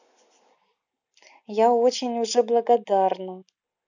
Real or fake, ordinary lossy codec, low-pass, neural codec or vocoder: real; none; 7.2 kHz; none